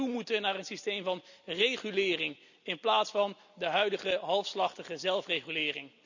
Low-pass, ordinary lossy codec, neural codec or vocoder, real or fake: 7.2 kHz; none; none; real